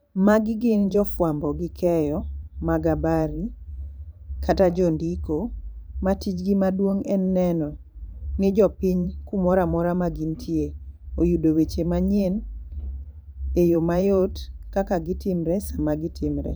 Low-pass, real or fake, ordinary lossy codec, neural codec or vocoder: none; fake; none; vocoder, 44.1 kHz, 128 mel bands every 512 samples, BigVGAN v2